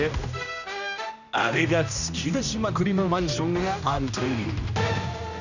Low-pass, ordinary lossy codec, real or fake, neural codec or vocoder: 7.2 kHz; none; fake; codec, 16 kHz, 1 kbps, X-Codec, HuBERT features, trained on general audio